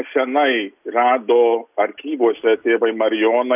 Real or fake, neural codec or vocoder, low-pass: real; none; 3.6 kHz